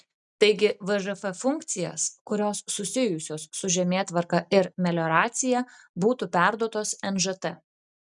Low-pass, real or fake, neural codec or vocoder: 10.8 kHz; real; none